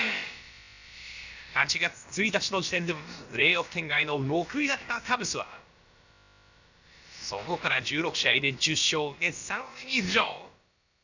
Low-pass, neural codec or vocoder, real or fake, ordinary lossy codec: 7.2 kHz; codec, 16 kHz, about 1 kbps, DyCAST, with the encoder's durations; fake; none